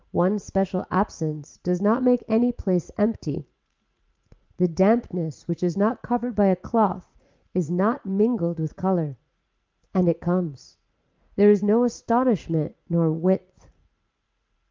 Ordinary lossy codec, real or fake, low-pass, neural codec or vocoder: Opus, 24 kbps; fake; 7.2 kHz; vocoder, 44.1 kHz, 80 mel bands, Vocos